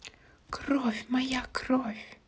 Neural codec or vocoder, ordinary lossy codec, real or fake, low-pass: none; none; real; none